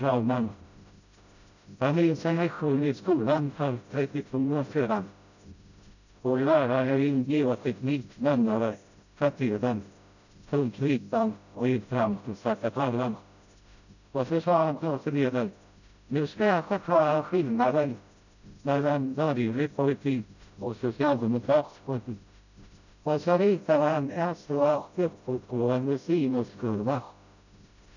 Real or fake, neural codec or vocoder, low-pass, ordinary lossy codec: fake; codec, 16 kHz, 0.5 kbps, FreqCodec, smaller model; 7.2 kHz; none